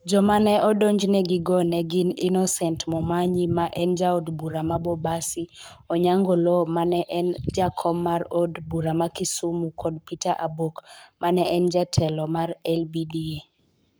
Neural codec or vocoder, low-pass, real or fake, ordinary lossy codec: codec, 44.1 kHz, 7.8 kbps, Pupu-Codec; none; fake; none